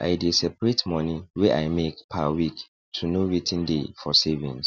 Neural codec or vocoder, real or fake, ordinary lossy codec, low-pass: none; real; none; none